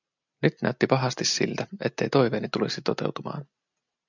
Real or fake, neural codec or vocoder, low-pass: real; none; 7.2 kHz